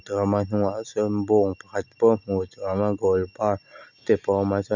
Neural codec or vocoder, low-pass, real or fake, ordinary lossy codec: none; 7.2 kHz; real; none